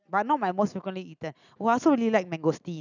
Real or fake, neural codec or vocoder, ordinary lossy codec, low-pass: real; none; none; 7.2 kHz